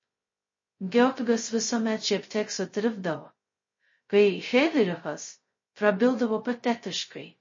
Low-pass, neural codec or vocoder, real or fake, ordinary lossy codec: 7.2 kHz; codec, 16 kHz, 0.2 kbps, FocalCodec; fake; MP3, 32 kbps